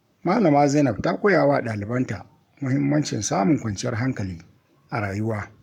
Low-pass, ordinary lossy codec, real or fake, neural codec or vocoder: 19.8 kHz; none; fake; codec, 44.1 kHz, 7.8 kbps, Pupu-Codec